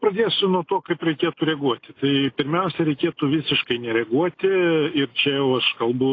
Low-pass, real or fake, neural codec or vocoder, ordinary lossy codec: 7.2 kHz; real; none; AAC, 32 kbps